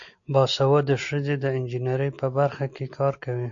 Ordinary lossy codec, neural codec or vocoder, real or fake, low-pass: AAC, 64 kbps; none; real; 7.2 kHz